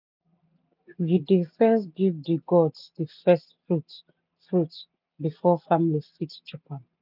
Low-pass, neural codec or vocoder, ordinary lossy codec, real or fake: 5.4 kHz; none; none; real